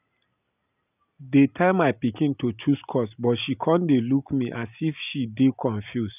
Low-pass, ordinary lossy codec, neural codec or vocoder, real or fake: 3.6 kHz; none; none; real